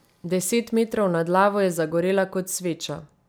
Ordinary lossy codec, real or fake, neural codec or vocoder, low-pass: none; real; none; none